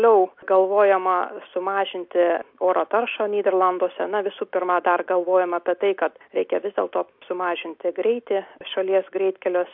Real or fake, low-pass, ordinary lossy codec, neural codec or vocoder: real; 5.4 kHz; MP3, 48 kbps; none